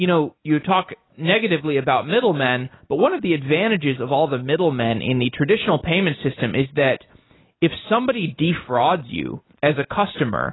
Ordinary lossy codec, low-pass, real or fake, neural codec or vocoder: AAC, 16 kbps; 7.2 kHz; real; none